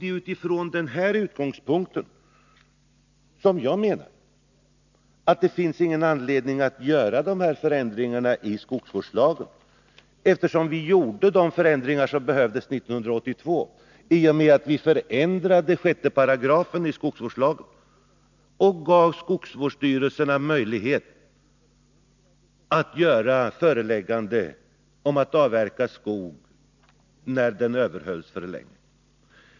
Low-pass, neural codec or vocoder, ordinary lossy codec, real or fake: 7.2 kHz; none; none; real